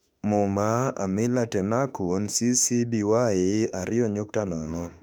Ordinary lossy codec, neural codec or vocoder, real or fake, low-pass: none; autoencoder, 48 kHz, 32 numbers a frame, DAC-VAE, trained on Japanese speech; fake; 19.8 kHz